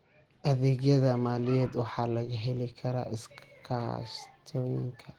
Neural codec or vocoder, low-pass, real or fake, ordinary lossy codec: none; 19.8 kHz; real; Opus, 16 kbps